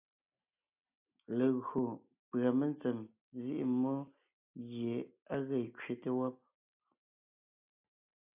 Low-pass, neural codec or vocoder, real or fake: 3.6 kHz; none; real